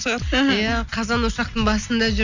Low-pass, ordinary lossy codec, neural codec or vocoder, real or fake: 7.2 kHz; none; none; real